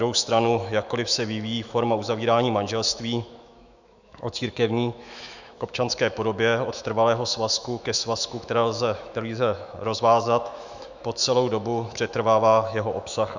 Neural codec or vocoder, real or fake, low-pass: autoencoder, 48 kHz, 128 numbers a frame, DAC-VAE, trained on Japanese speech; fake; 7.2 kHz